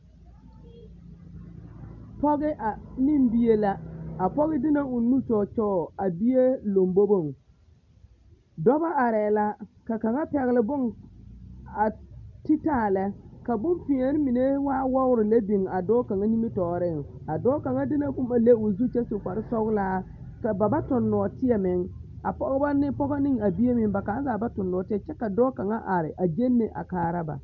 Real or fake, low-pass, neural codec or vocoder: real; 7.2 kHz; none